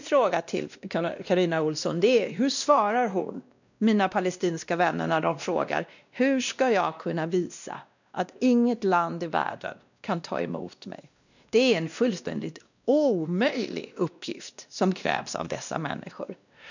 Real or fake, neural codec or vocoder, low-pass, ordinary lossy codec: fake; codec, 16 kHz, 1 kbps, X-Codec, WavLM features, trained on Multilingual LibriSpeech; 7.2 kHz; none